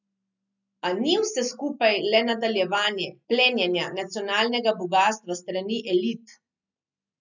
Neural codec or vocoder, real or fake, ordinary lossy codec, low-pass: none; real; none; 7.2 kHz